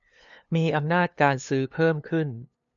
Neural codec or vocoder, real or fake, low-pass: codec, 16 kHz, 2 kbps, FunCodec, trained on LibriTTS, 25 frames a second; fake; 7.2 kHz